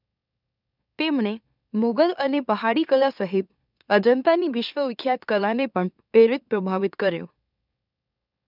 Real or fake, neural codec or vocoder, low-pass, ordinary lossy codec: fake; autoencoder, 44.1 kHz, a latent of 192 numbers a frame, MeloTTS; 5.4 kHz; none